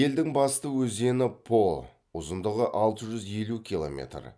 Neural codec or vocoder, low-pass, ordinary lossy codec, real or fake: none; none; none; real